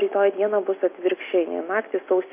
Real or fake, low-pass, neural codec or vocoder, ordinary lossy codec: real; 3.6 kHz; none; MP3, 24 kbps